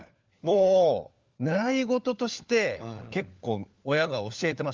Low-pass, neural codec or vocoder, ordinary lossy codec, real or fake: 7.2 kHz; codec, 16 kHz, 4 kbps, FunCodec, trained on LibriTTS, 50 frames a second; Opus, 32 kbps; fake